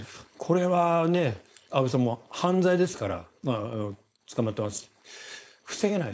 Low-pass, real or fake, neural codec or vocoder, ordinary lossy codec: none; fake; codec, 16 kHz, 4.8 kbps, FACodec; none